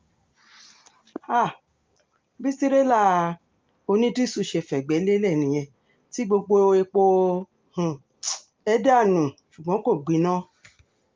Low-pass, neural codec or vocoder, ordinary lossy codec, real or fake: 7.2 kHz; none; Opus, 24 kbps; real